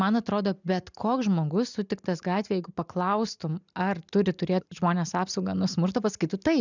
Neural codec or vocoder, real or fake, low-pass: none; real; 7.2 kHz